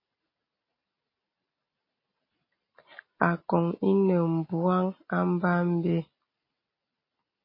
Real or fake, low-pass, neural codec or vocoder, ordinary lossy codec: real; 5.4 kHz; none; MP3, 24 kbps